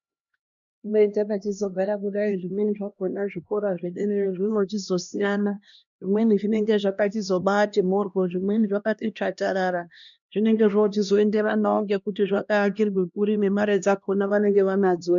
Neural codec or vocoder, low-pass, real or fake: codec, 16 kHz, 1 kbps, X-Codec, HuBERT features, trained on LibriSpeech; 7.2 kHz; fake